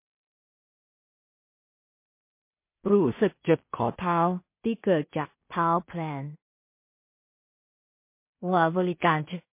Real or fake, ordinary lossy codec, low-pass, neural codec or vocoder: fake; MP3, 24 kbps; 3.6 kHz; codec, 16 kHz in and 24 kHz out, 0.4 kbps, LongCat-Audio-Codec, two codebook decoder